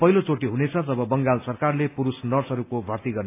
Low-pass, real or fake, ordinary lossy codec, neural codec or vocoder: 3.6 kHz; real; AAC, 24 kbps; none